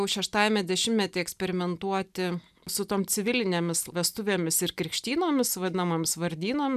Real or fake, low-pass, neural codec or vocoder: real; 14.4 kHz; none